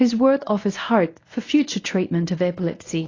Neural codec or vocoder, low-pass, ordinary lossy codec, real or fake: codec, 24 kHz, 0.9 kbps, WavTokenizer, medium speech release version 1; 7.2 kHz; AAC, 32 kbps; fake